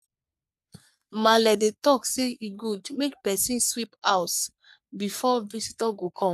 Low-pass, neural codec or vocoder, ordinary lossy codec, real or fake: 14.4 kHz; codec, 44.1 kHz, 3.4 kbps, Pupu-Codec; none; fake